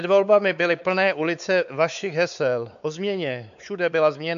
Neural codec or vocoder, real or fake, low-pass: codec, 16 kHz, 4 kbps, X-Codec, WavLM features, trained on Multilingual LibriSpeech; fake; 7.2 kHz